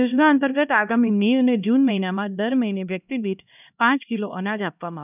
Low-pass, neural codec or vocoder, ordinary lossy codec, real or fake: 3.6 kHz; codec, 16 kHz, 1 kbps, X-Codec, HuBERT features, trained on LibriSpeech; none; fake